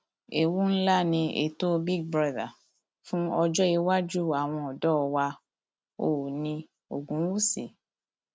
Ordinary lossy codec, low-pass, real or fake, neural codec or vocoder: none; none; real; none